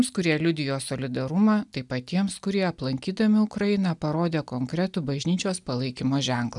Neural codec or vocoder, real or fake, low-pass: none; real; 10.8 kHz